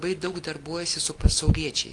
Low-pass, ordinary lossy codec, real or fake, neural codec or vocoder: 10.8 kHz; Opus, 32 kbps; real; none